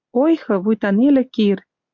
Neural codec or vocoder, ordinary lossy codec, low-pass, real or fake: none; MP3, 64 kbps; 7.2 kHz; real